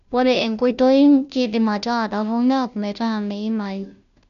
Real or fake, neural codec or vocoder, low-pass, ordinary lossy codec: fake; codec, 16 kHz, 0.5 kbps, FunCodec, trained on Chinese and English, 25 frames a second; 7.2 kHz; none